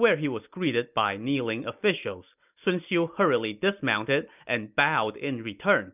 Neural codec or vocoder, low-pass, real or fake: none; 3.6 kHz; real